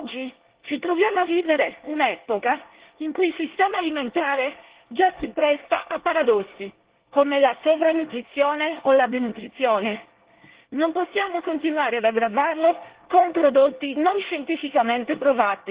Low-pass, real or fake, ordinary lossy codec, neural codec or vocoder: 3.6 kHz; fake; Opus, 16 kbps; codec, 24 kHz, 1 kbps, SNAC